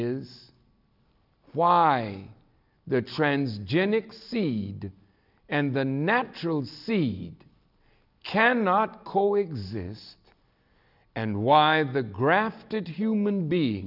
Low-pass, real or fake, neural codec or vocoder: 5.4 kHz; real; none